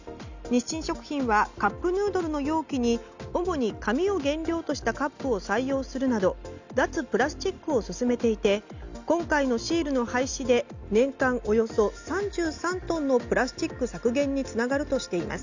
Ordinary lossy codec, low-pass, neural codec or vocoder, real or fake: Opus, 64 kbps; 7.2 kHz; none; real